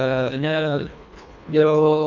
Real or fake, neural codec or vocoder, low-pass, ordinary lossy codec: fake; codec, 24 kHz, 1.5 kbps, HILCodec; 7.2 kHz; none